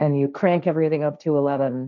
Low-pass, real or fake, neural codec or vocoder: 7.2 kHz; fake; codec, 16 kHz, 1.1 kbps, Voila-Tokenizer